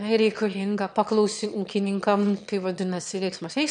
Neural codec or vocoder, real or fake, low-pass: autoencoder, 22.05 kHz, a latent of 192 numbers a frame, VITS, trained on one speaker; fake; 9.9 kHz